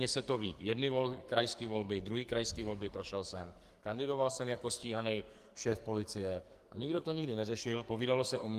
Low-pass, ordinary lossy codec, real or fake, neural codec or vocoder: 14.4 kHz; Opus, 32 kbps; fake; codec, 44.1 kHz, 2.6 kbps, SNAC